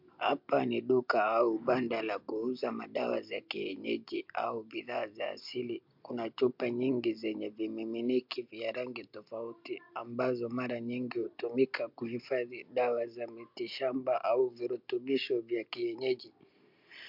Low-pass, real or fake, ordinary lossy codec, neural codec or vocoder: 5.4 kHz; real; MP3, 48 kbps; none